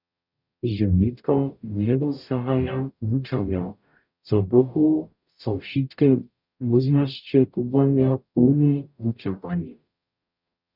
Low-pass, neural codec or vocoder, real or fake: 5.4 kHz; codec, 44.1 kHz, 0.9 kbps, DAC; fake